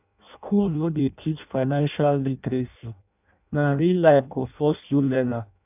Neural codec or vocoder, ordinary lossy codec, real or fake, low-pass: codec, 16 kHz in and 24 kHz out, 0.6 kbps, FireRedTTS-2 codec; none; fake; 3.6 kHz